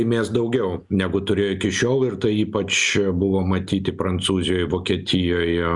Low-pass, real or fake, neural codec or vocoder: 10.8 kHz; real; none